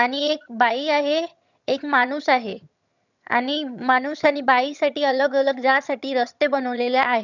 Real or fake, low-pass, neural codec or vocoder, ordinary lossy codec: fake; 7.2 kHz; vocoder, 22.05 kHz, 80 mel bands, HiFi-GAN; none